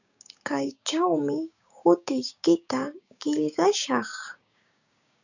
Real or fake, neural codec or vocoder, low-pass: fake; codec, 16 kHz, 6 kbps, DAC; 7.2 kHz